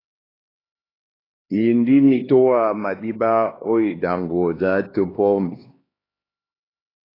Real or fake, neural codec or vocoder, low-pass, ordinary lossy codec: fake; codec, 16 kHz, 2 kbps, X-Codec, HuBERT features, trained on LibriSpeech; 5.4 kHz; AAC, 24 kbps